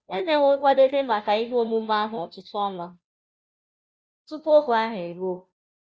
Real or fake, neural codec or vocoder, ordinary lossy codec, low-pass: fake; codec, 16 kHz, 0.5 kbps, FunCodec, trained on Chinese and English, 25 frames a second; none; none